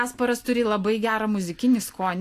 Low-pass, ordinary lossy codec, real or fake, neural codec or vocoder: 14.4 kHz; AAC, 64 kbps; real; none